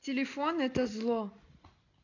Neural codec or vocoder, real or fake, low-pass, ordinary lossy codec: none; real; 7.2 kHz; none